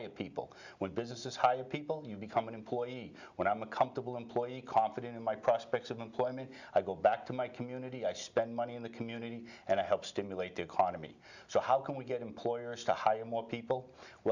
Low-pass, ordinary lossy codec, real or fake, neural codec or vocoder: 7.2 kHz; Opus, 64 kbps; real; none